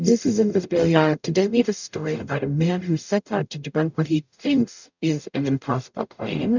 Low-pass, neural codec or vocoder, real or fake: 7.2 kHz; codec, 44.1 kHz, 0.9 kbps, DAC; fake